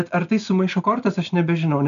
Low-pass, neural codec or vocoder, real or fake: 7.2 kHz; none; real